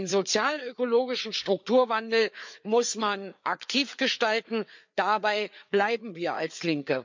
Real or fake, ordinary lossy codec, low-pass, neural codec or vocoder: fake; MP3, 64 kbps; 7.2 kHz; codec, 16 kHz, 4 kbps, FreqCodec, larger model